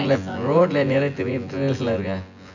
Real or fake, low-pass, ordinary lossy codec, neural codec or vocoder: fake; 7.2 kHz; none; vocoder, 24 kHz, 100 mel bands, Vocos